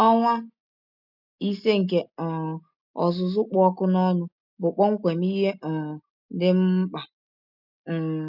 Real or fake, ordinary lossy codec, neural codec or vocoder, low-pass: real; none; none; 5.4 kHz